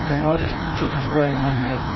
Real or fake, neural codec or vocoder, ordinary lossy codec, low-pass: fake; codec, 16 kHz, 1 kbps, FreqCodec, larger model; MP3, 24 kbps; 7.2 kHz